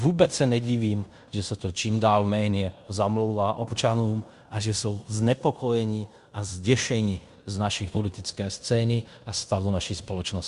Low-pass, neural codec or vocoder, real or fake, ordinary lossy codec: 10.8 kHz; codec, 16 kHz in and 24 kHz out, 0.9 kbps, LongCat-Audio-Codec, fine tuned four codebook decoder; fake; Opus, 64 kbps